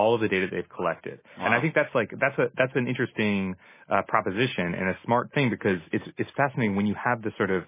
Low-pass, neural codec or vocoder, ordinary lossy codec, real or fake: 3.6 kHz; none; MP3, 16 kbps; real